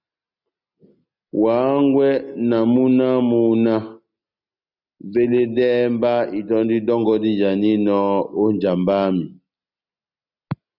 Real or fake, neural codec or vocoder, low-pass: real; none; 5.4 kHz